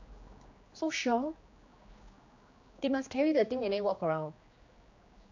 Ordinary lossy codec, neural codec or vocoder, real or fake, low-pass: AAC, 48 kbps; codec, 16 kHz, 2 kbps, X-Codec, HuBERT features, trained on general audio; fake; 7.2 kHz